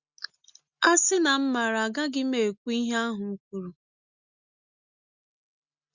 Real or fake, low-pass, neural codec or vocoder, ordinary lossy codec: real; 7.2 kHz; none; Opus, 64 kbps